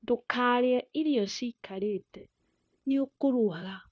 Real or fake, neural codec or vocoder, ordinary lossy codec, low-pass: fake; codec, 16 kHz, 0.9 kbps, LongCat-Audio-Codec; none; none